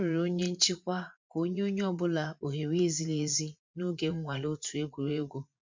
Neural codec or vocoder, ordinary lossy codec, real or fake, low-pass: vocoder, 44.1 kHz, 80 mel bands, Vocos; MP3, 48 kbps; fake; 7.2 kHz